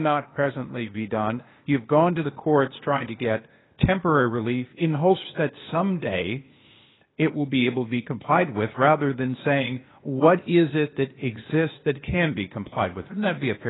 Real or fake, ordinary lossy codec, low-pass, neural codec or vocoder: fake; AAC, 16 kbps; 7.2 kHz; codec, 16 kHz, 0.8 kbps, ZipCodec